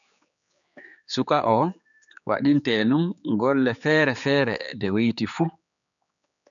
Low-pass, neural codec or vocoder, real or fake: 7.2 kHz; codec, 16 kHz, 4 kbps, X-Codec, HuBERT features, trained on general audio; fake